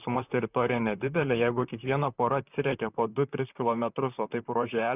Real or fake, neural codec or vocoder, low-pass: fake; vocoder, 44.1 kHz, 128 mel bands, Pupu-Vocoder; 3.6 kHz